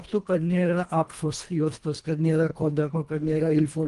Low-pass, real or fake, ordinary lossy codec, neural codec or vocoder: 10.8 kHz; fake; Opus, 32 kbps; codec, 24 kHz, 1.5 kbps, HILCodec